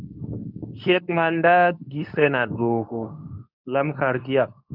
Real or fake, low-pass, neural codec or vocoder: fake; 5.4 kHz; codec, 24 kHz, 0.9 kbps, WavTokenizer, medium speech release version 2